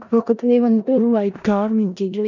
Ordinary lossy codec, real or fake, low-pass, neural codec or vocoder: none; fake; 7.2 kHz; codec, 16 kHz in and 24 kHz out, 0.4 kbps, LongCat-Audio-Codec, four codebook decoder